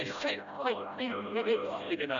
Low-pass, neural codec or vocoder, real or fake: 7.2 kHz; codec, 16 kHz, 0.5 kbps, FreqCodec, smaller model; fake